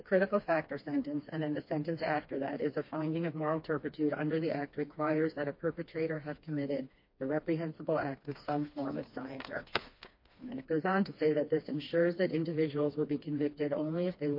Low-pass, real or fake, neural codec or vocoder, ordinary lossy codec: 5.4 kHz; fake; codec, 16 kHz, 2 kbps, FreqCodec, smaller model; MP3, 32 kbps